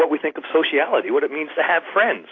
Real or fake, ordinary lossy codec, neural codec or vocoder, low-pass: real; AAC, 32 kbps; none; 7.2 kHz